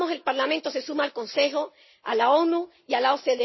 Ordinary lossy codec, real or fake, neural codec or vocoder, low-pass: MP3, 24 kbps; real; none; 7.2 kHz